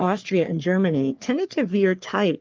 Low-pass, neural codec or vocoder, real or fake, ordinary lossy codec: 7.2 kHz; codec, 44.1 kHz, 3.4 kbps, Pupu-Codec; fake; Opus, 24 kbps